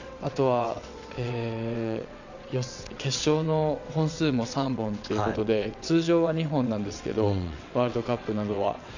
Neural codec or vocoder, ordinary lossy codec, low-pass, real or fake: vocoder, 22.05 kHz, 80 mel bands, WaveNeXt; none; 7.2 kHz; fake